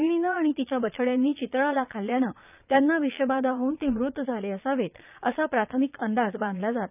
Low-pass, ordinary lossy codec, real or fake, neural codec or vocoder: 3.6 kHz; none; fake; vocoder, 22.05 kHz, 80 mel bands, Vocos